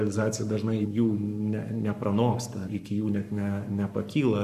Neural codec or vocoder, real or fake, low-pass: codec, 44.1 kHz, 7.8 kbps, Pupu-Codec; fake; 14.4 kHz